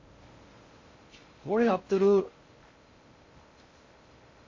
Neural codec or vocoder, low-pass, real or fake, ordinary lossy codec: codec, 16 kHz in and 24 kHz out, 0.6 kbps, FocalCodec, streaming, 2048 codes; 7.2 kHz; fake; MP3, 32 kbps